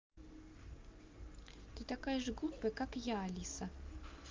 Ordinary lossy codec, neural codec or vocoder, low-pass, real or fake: Opus, 32 kbps; none; 7.2 kHz; real